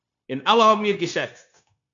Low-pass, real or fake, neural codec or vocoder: 7.2 kHz; fake; codec, 16 kHz, 0.9 kbps, LongCat-Audio-Codec